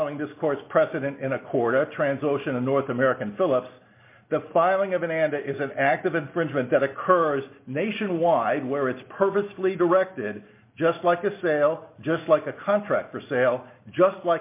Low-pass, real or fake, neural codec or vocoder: 3.6 kHz; real; none